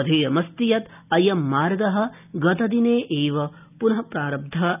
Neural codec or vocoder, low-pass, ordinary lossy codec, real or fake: none; 3.6 kHz; none; real